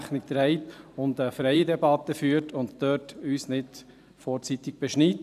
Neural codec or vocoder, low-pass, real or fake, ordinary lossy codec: vocoder, 44.1 kHz, 128 mel bands every 512 samples, BigVGAN v2; 14.4 kHz; fake; none